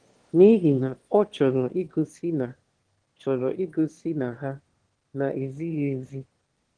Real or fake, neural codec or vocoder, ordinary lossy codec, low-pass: fake; autoencoder, 22.05 kHz, a latent of 192 numbers a frame, VITS, trained on one speaker; Opus, 16 kbps; 9.9 kHz